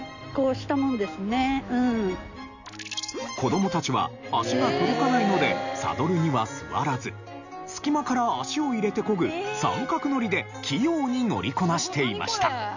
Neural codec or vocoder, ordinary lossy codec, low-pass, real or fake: none; none; 7.2 kHz; real